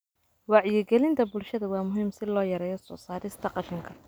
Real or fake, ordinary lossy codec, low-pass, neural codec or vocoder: real; none; none; none